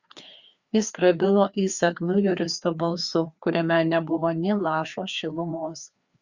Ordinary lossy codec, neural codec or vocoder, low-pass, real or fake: Opus, 64 kbps; codec, 16 kHz, 2 kbps, FreqCodec, larger model; 7.2 kHz; fake